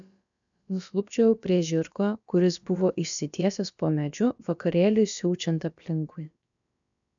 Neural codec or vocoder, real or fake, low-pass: codec, 16 kHz, about 1 kbps, DyCAST, with the encoder's durations; fake; 7.2 kHz